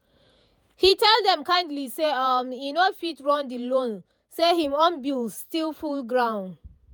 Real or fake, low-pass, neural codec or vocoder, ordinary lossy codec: fake; none; vocoder, 48 kHz, 128 mel bands, Vocos; none